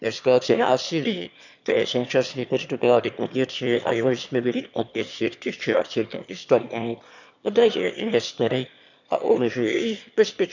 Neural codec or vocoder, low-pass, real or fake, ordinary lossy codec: autoencoder, 22.05 kHz, a latent of 192 numbers a frame, VITS, trained on one speaker; 7.2 kHz; fake; none